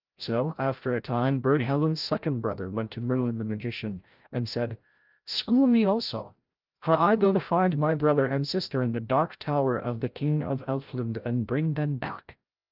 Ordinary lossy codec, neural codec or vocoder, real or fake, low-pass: Opus, 32 kbps; codec, 16 kHz, 0.5 kbps, FreqCodec, larger model; fake; 5.4 kHz